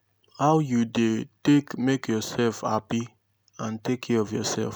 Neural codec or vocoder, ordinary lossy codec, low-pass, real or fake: none; none; none; real